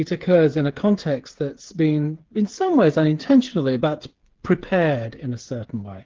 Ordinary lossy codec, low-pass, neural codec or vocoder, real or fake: Opus, 16 kbps; 7.2 kHz; codec, 16 kHz, 8 kbps, FreqCodec, smaller model; fake